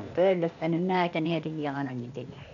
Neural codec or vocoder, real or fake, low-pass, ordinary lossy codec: codec, 16 kHz, 0.8 kbps, ZipCodec; fake; 7.2 kHz; none